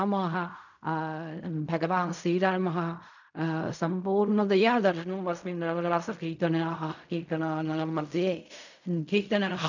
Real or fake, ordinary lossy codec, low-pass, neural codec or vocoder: fake; none; 7.2 kHz; codec, 16 kHz in and 24 kHz out, 0.4 kbps, LongCat-Audio-Codec, fine tuned four codebook decoder